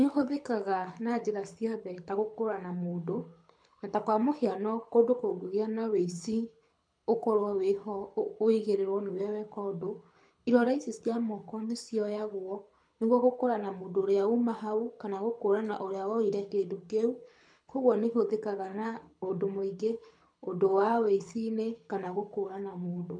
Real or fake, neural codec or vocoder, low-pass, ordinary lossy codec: fake; codec, 24 kHz, 6 kbps, HILCodec; 9.9 kHz; MP3, 64 kbps